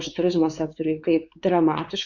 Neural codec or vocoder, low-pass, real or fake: codec, 16 kHz, 4 kbps, X-Codec, WavLM features, trained on Multilingual LibriSpeech; 7.2 kHz; fake